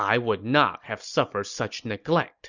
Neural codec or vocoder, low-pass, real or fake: none; 7.2 kHz; real